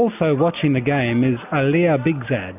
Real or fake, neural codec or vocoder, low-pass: real; none; 3.6 kHz